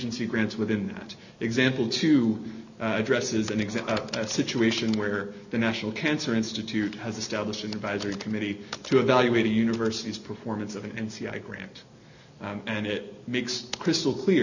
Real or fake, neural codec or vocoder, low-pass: real; none; 7.2 kHz